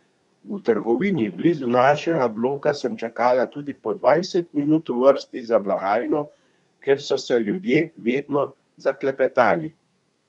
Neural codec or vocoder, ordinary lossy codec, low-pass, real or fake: codec, 24 kHz, 1 kbps, SNAC; none; 10.8 kHz; fake